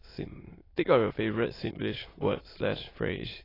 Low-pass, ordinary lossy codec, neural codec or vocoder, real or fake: 5.4 kHz; AAC, 24 kbps; autoencoder, 22.05 kHz, a latent of 192 numbers a frame, VITS, trained on many speakers; fake